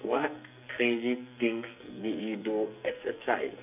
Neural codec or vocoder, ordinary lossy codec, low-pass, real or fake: codec, 44.1 kHz, 2.6 kbps, SNAC; none; 3.6 kHz; fake